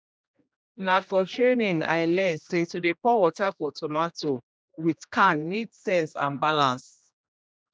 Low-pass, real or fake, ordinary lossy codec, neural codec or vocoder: none; fake; none; codec, 16 kHz, 1 kbps, X-Codec, HuBERT features, trained on general audio